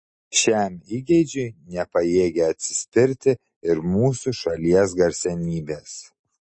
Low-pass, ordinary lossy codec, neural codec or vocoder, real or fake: 9.9 kHz; MP3, 32 kbps; none; real